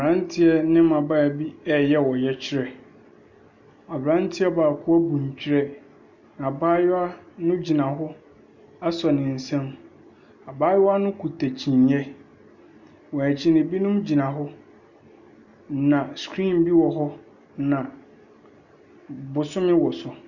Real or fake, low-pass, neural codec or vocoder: real; 7.2 kHz; none